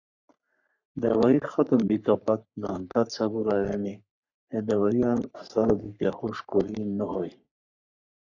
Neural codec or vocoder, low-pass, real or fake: codec, 44.1 kHz, 3.4 kbps, Pupu-Codec; 7.2 kHz; fake